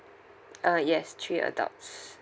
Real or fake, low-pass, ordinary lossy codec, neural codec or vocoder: real; none; none; none